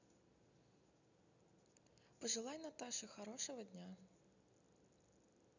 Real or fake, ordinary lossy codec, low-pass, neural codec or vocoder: real; none; 7.2 kHz; none